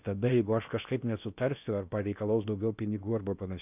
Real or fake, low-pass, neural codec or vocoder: fake; 3.6 kHz; codec, 16 kHz in and 24 kHz out, 0.8 kbps, FocalCodec, streaming, 65536 codes